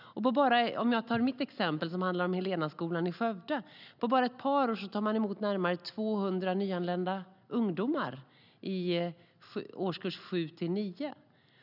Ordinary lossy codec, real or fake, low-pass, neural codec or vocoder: none; real; 5.4 kHz; none